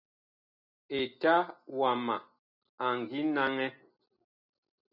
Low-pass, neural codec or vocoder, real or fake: 5.4 kHz; none; real